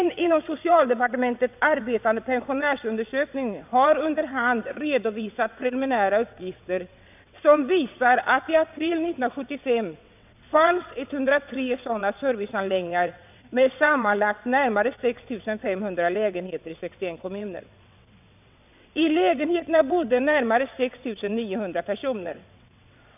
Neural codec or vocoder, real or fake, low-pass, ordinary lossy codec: vocoder, 22.05 kHz, 80 mel bands, WaveNeXt; fake; 3.6 kHz; none